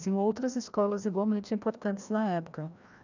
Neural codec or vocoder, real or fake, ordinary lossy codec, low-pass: codec, 16 kHz, 1 kbps, FreqCodec, larger model; fake; none; 7.2 kHz